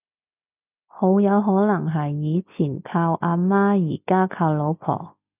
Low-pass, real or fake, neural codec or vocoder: 3.6 kHz; real; none